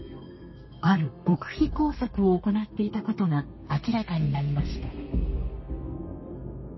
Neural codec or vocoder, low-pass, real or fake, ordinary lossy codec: codec, 32 kHz, 1.9 kbps, SNAC; 7.2 kHz; fake; MP3, 24 kbps